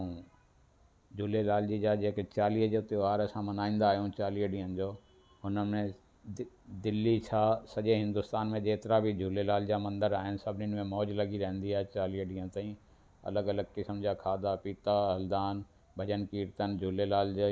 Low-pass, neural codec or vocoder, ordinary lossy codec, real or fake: none; none; none; real